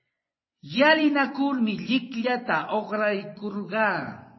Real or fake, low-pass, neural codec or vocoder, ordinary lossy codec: fake; 7.2 kHz; vocoder, 24 kHz, 100 mel bands, Vocos; MP3, 24 kbps